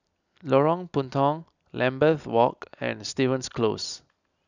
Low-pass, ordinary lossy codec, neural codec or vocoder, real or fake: 7.2 kHz; none; none; real